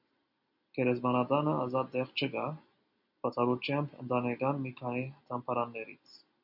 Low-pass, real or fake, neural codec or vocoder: 5.4 kHz; real; none